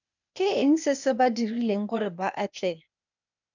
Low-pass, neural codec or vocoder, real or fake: 7.2 kHz; codec, 16 kHz, 0.8 kbps, ZipCodec; fake